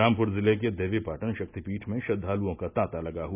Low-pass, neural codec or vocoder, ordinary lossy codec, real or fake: 3.6 kHz; none; none; real